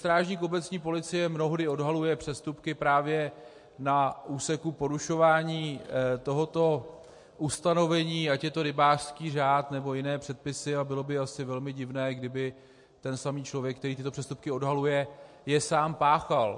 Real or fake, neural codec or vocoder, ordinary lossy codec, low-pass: real; none; MP3, 48 kbps; 10.8 kHz